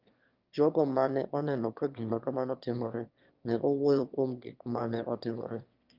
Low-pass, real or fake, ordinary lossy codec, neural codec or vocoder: 5.4 kHz; fake; Opus, 24 kbps; autoencoder, 22.05 kHz, a latent of 192 numbers a frame, VITS, trained on one speaker